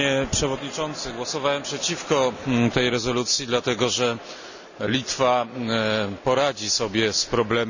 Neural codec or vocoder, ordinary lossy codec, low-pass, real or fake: none; none; 7.2 kHz; real